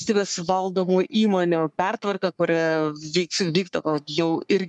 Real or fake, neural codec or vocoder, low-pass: fake; codec, 44.1 kHz, 3.4 kbps, Pupu-Codec; 10.8 kHz